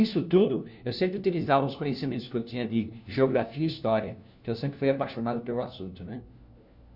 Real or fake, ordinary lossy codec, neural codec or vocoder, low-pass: fake; none; codec, 16 kHz, 1 kbps, FunCodec, trained on LibriTTS, 50 frames a second; 5.4 kHz